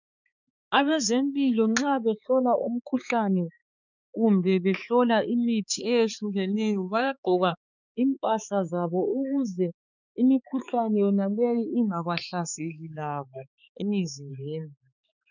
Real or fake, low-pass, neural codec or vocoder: fake; 7.2 kHz; codec, 16 kHz, 4 kbps, X-Codec, HuBERT features, trained on balanced general audio